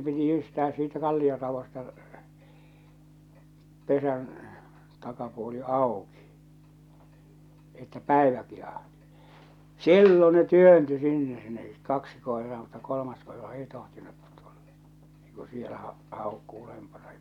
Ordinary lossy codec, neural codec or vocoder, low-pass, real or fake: none; none; 19.8 kHz; real